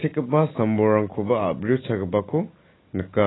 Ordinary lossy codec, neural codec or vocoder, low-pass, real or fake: AAC, 16 kbps; none; 7.2 kHz; real